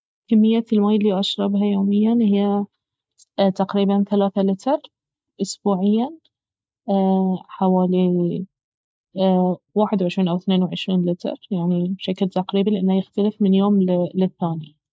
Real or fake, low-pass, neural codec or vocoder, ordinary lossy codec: real; none; none; none